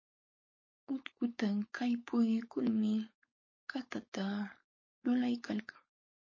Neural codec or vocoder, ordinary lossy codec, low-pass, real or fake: codec, 16 kHz, 4.8 kbps, FACodec; MP3, 32 kbps; 7.2 kHz; fake